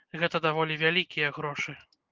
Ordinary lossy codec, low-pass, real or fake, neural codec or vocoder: Opus, 24 kbps; 7.2 kHz; real; none